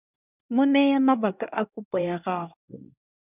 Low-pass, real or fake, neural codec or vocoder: 3.6 kHz; fake; codec, 24 kHz, 1 kbps, SNAC